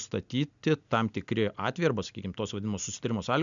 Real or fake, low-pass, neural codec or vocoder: real; 7.2 kHz; none